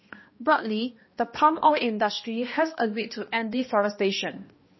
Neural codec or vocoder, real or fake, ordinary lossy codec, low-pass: codec, 16 kHz, 1 kbps, X-Codec, HuBERT features, trained on balanced general audio; fake; MP3, 24 kbps; 7.2 kHz